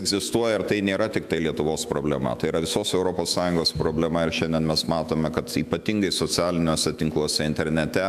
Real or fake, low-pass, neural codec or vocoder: fake; 14.4 kHz; autoencoder, 48 kHz, 128 numbers a frame, DAC-VAE, trained on Japanese speech